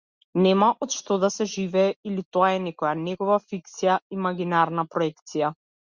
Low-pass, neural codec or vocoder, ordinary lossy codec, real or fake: 7.2 kHz; none; Opus, 64 kbps; real